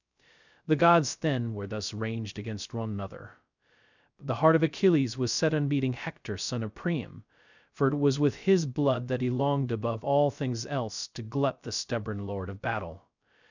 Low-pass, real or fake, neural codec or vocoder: 7.2 kHz; fake; codec, 16 kHz, 0.2 kbps, FocalCodec